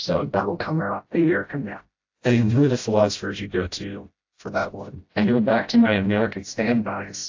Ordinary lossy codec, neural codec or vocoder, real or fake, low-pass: AAC, 48 kbps; codec, 16 kHz, 0.5 kbps, FreqCodec, smaller model; fake; 7.2 kHz